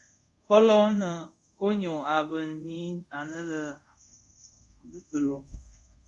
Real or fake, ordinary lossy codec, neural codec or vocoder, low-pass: fake; Opus, 64 kbps; codec, 24 kHz, 0.5 kbps, DualCodec; 10.8 kHz